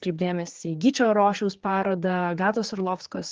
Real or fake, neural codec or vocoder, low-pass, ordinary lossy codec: fake; codec, 16 kHz, 4 kbps, X-Codec, HuBERT features, trained on general audio; 7.2 kHz; Opus, 16 kbps